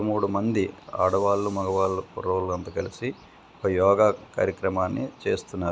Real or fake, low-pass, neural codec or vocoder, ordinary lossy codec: real; none; none; none